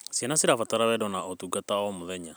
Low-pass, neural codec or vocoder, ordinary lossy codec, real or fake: none; none; none; real